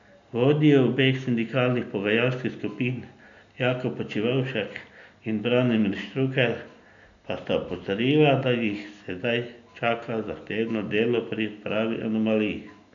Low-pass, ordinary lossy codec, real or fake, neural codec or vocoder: 7.2 kHz; none; real; none